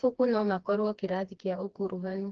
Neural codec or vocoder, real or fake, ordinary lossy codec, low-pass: codec, 16 kHz, 2 kbps, FreqCodec, smaller model; fake; Opus, 16 kbps; 7.2 kHz